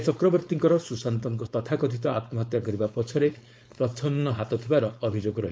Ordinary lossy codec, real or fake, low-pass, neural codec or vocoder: none; fake; none; codec, 16 kHz, 4.8 kbps, FACodec